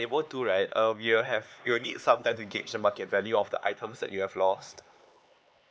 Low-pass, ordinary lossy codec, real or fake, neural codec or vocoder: none; none; fake; codec, 16 kHz, 4 kbps, X-Codec, HuBERT features, trained on LibriSpeech